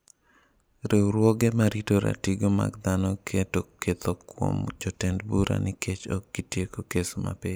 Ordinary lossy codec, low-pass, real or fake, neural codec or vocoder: none; none; real; none